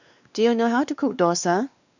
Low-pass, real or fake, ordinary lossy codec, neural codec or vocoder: 7.2 kHz; fake; none; codec, 16 kHz, 2 kbps, X-Codec, WavLM features, trained on Multilingual LibriSpeech